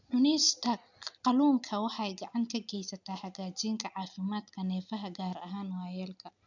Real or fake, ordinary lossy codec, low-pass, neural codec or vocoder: real; none; 7.2 kHz; none